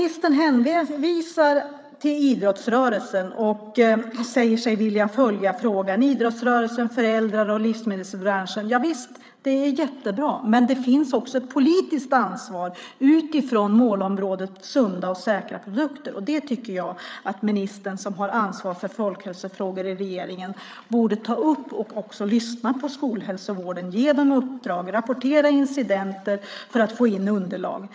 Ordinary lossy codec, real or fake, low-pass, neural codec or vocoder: none; fake; none; codec, 16 kHz, 8 kbps, FreqCodec, larger model